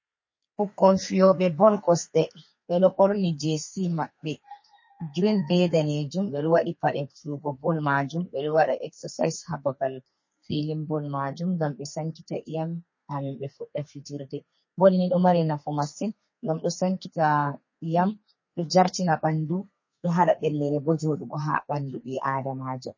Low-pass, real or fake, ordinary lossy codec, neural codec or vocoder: 7.2 kHz; fake; MP3, 32 kbps; codec, 32 kHz, 1.9 kbps, SNAC